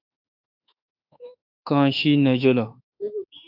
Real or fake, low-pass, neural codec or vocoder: fake; 5.4 kHz; autoencoder, 48 kHz, 32 numbers a frame, DAC-VAE, trained on Japanese speech